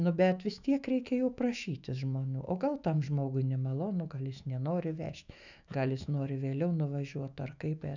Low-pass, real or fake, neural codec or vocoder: 7.2 kHz; fake; autoencoder, 48 kHz, 128 numbers a frame, DAC-VAE, trained on Japanese speech